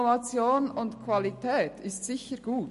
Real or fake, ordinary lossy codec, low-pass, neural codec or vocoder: real; MP3, 48 kbps; 14.4 kHz; none